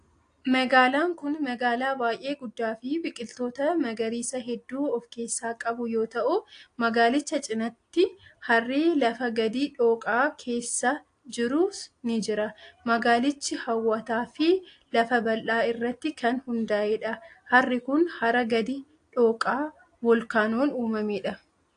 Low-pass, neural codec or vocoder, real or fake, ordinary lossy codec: 9.9 kHz; none; real; AAC, 48 kbps